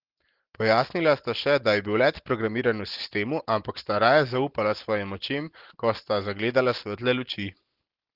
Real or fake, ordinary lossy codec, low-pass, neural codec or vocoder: real; Opus, 16 kbps; 5.4 kHz; none